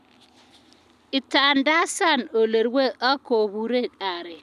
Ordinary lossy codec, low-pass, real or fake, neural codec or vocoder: none; 14.4 kHz; real; none